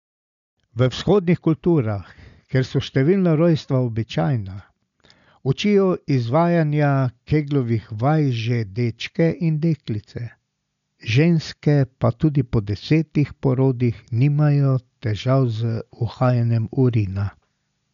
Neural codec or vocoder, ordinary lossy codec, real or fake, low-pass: none; none; real; 7.2 kHz